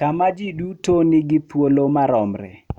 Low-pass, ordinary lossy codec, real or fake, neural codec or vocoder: 19.8 kHz; none; real; none